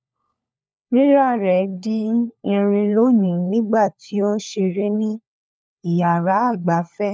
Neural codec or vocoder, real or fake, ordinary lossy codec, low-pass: codec, 16 kHz, 4 kbps, FunCodec, trained on LibriTTS, 50 frames a second; fake; none; none